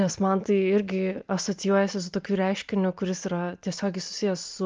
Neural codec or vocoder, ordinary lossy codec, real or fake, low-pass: none; Opus, 24 kbps; real; 7.2 kHz